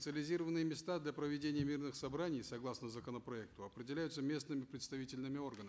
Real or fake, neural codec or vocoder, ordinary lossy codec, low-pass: real; none; none; none